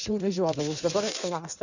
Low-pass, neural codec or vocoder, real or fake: 7.2 kHz; codec, 16 kHz in and 24 kHz out, 1.1 kbps, FireRedTTS-2 codec; fake